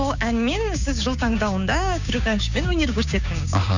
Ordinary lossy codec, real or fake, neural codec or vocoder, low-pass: none; fake; codec, 16 kHz, 6 kbps, DAC; 7.2 kHz